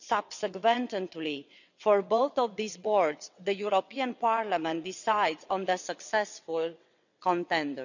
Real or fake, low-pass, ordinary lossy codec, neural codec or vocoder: fake; 7.2 kHz; none; vocoder, 22.05 kHz, 80 mel bands, WaveNeXt